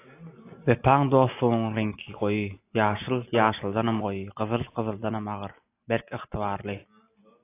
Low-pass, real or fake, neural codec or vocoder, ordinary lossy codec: 3.6 kHz; real; none; AAC, 24 kbps